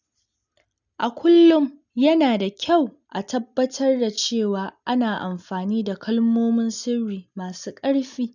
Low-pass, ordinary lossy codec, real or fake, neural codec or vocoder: 7.2 kHz; none; real; none